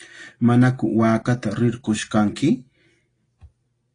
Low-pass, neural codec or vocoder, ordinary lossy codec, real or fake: 9.9 kHz; none; AAC, 32 kbps; real